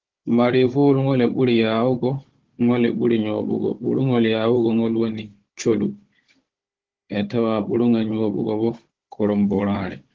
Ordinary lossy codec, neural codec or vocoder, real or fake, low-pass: Opus, 16 kbps; codec, 16 kHz, 16 kbps, FunCodec, trained on Chinese and English, 50 frames a second; fake; 7.2 kHz